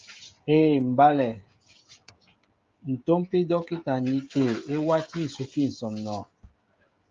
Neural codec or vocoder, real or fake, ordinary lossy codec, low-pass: codec, 16 kHz, 16 kbps, FreqCodec, smaller model; fake; Opus, 32 kbps; 7.2 kHz